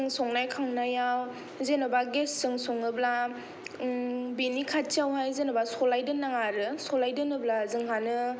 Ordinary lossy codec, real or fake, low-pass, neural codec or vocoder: none; real; none; none